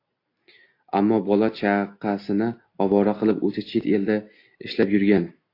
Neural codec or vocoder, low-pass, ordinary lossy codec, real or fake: none; 5.4 kHz; AAC, 32 kbps; real